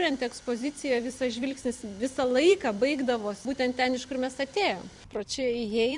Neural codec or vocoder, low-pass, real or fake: vocoder, 44.1 kHz, 128 mel bands every 256 samples, BigVGAN v2; 10.8 kHz; fake